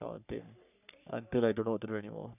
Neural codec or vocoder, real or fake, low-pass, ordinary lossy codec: codec, 44.1 kHz, 3.4 kbps, Pupu-Codec; fake; 3.6 kHz; none